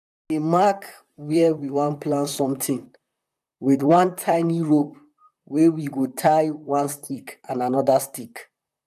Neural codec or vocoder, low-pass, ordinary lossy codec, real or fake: vocoder, 44.1 kHz, 128 mel bands, Pupu-Vocoder; 14.4 kHz; none; fake